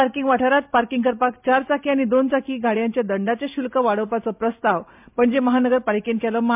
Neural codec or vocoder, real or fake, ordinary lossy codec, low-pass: none; real; MP3, 32 kbps; 3.6 kHz